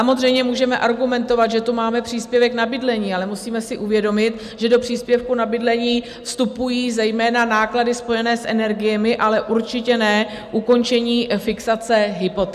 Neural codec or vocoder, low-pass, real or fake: none; 14.4 kHz; real